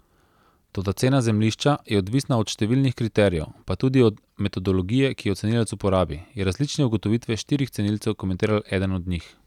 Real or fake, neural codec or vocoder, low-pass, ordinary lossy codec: real; none; 19.8 kHz; none